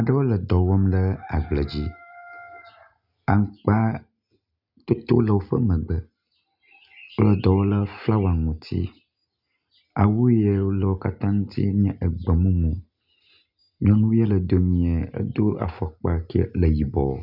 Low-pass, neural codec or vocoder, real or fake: 5.4 kHz; none; real